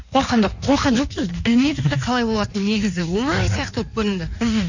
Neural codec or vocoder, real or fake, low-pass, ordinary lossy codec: codec, 16 kHz in and 24 kHz out, 1.1 kbps, FireRedTTS-2 codec; fake; 7.2 kHz; none